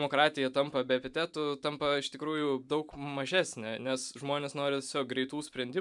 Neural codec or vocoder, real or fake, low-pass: vocoder, 44.1 kHz, 128 mel bands every 512 samples, BigVGAN v2; fake; 10.8 kHz